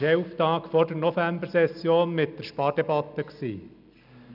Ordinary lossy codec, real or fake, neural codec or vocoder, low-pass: none; real; none; 5.4 kHz